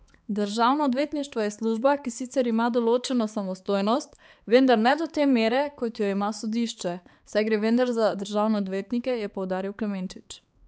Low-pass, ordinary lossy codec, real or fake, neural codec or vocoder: none; none; fake; codec, 16 kHz, 4 kbps, X-Codec, HuBERT features, trained on balanced general audio